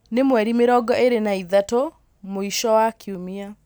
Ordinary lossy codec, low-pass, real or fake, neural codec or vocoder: none; none; real; none